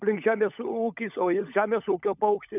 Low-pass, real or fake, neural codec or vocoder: 3.6 kHz; fake; codec, 16 kHz, 16 kbps, FunCodec, trained on LibriTTS, 50 frames a second